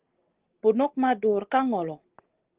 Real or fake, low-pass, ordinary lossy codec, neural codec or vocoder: real; 3.6 kHz; Opus, 16 kbps; none